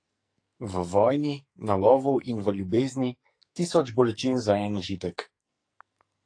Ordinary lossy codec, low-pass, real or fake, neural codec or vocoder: AAC, 48 kbps; 9.9 kHz; fake; codec, 44.1 kHz, 2.6 kbps, SNAC